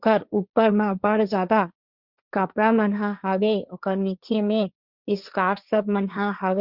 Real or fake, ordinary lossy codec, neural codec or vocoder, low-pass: fake; Opus, 64 kbps; codec, 16 kHz, 1.1 kbps, Voila-Tokenizer; 5.4 kHz